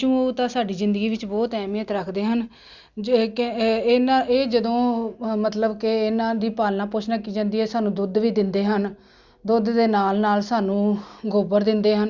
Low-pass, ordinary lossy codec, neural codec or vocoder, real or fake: 7.2 kHz; Opus, 64 kbps; none; real